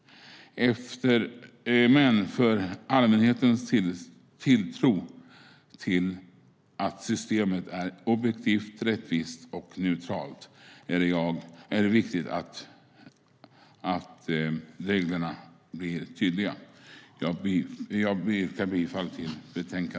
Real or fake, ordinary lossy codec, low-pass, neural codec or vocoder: real; none; none; none